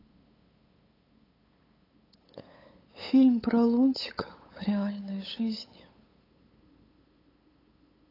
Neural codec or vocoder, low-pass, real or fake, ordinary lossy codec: codec, 16 kHz, 8 kbps, FunCodec, trained on LibriTTS, 25 frames a second; 5.4 kHz; fake; AAC, 24 kbps